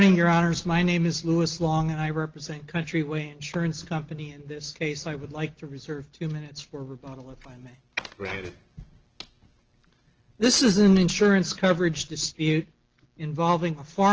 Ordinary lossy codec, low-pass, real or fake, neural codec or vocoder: Opus, 16 kbps; 7.2 kHz; real; none